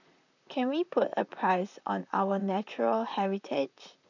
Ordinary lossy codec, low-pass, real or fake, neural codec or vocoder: none; 7.2 kHz; fake; vocoder, 44.1 kHz, 128 mel bands, Pupu-Vocoder